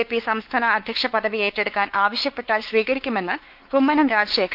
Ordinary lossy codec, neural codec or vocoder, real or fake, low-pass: Opus, 32 kbps; codec, 16 kHz, 4 kbps, X-Codec, WavLM features, trained on Multilingual LibriSpeech; fake; 5.4 kHz